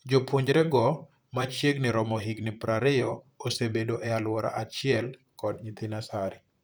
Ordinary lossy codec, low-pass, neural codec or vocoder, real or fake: none; none; vocoder, 44.1 kHz, 128 mel bands, Pupu-Vocoder; fake